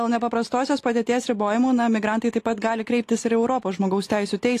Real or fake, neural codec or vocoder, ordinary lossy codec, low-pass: real; none; AAC, 48 kbps; 14.4 kHz